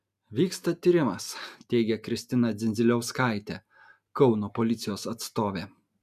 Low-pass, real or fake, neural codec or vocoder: 14.4 kHz; real; none